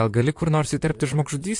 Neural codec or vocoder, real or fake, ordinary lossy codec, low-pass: vocoder, 44.1 kHz, 128 mel bands, Pupu-Vocoder; fake; MP3, 64 kbps; 10.8 kHz